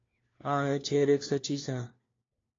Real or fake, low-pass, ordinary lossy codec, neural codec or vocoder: fake; 7.2 kHz; AAC, 32 kbps; codec, 16 kHz, 1 kbps, FunCodec, trained on LibriTTS, 50 frames a second